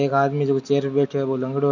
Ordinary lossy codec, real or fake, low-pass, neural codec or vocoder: none; real; 7.2 kHz; none